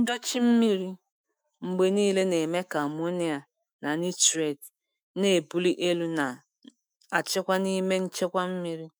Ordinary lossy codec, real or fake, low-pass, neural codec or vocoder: none; fake; none; autoencoder, 48 kHz, 128 numbers a frame, DAC-VAE, trained on Japanese speech